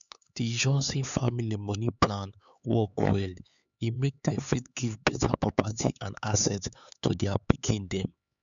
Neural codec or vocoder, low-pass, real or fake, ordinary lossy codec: codec, 16 kHz, 4 kbps, X-Codec, HuBERT features, trained on LibriSpeech; 7.2 kHz; fake; none